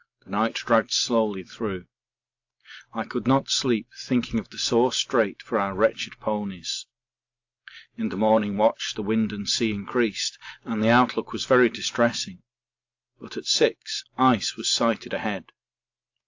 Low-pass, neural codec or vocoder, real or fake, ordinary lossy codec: 7.2 kHz; none; real; AAC, 48 kbps